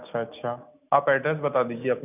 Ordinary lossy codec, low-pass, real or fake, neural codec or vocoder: none; 3.6 kHz; real; none